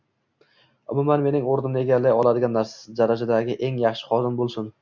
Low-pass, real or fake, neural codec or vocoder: 7.2 kHz; real; none